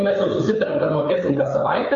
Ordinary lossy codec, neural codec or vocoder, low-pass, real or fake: MP3, 64 kbps; codec, 16 kHz, 4 kbps, FreqCodec, larger model; 7.2 kHz; fake